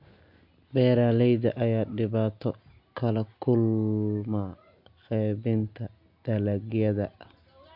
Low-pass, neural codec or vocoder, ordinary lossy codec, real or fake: 5.4 kHz; none; MP3, 48 kbps; real